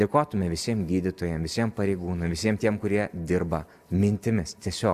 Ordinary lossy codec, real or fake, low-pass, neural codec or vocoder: Opus, 64 kbps; real; 14.4 kHz; none